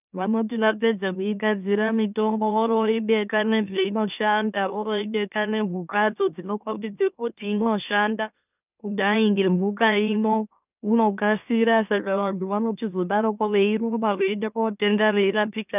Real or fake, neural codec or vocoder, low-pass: fake; autoencoder, 44.1 kHz, a latent of 192 numbers a frame, MeloTTS; 3.6 kHz